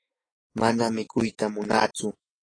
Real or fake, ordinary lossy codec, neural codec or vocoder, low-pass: fake; AAC, 32 kbps; autoencoder, 48 kHz, 128 numbers a frame, DAC-VAE, trained on Japanese speech; 9.9 kHz